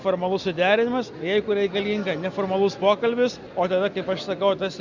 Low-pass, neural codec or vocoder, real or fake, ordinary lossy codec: 7.2 kHz; none; real; Opus, 64 kbps